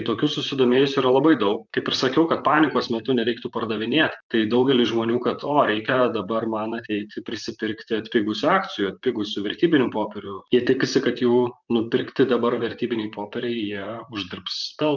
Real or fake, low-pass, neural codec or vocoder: fake; 7.2 kHz; vocoder, 44.1 kHz, 128 mel bands, Pupu-Vocoder